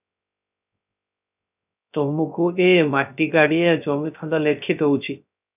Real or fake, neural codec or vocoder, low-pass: fake; codec, 16 kHz, 0.3 kbps, FocalCodec; 3.6 kHz